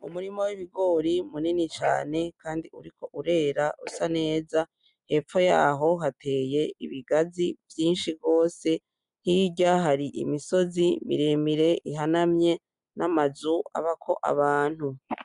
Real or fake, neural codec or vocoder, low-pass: real; none; 10.8 kHz